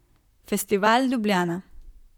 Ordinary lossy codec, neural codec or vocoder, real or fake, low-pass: none; vocoder, 44.1 kHz, 128 mel bands, Pupu-Vocoder; fake; 19.8 kHz